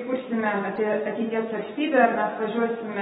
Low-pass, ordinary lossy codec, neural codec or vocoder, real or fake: 19.8 kHz; AAC, 16 kbps; none; real